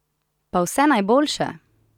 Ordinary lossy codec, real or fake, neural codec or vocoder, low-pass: none; real; none; 19.8 kHz